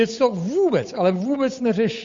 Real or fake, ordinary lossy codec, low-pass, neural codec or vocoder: fake; MP3, 48 kbps; 7.2 kHz; codec, 16 kHz, 8 kbps, FunCodec, trained on Chinese and English, 25 frames a second